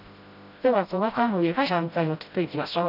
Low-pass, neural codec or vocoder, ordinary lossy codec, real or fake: 5.4 kHz; codec, 16 kHz, 0.5 kbps, FreqCodec, smaller model; none; fake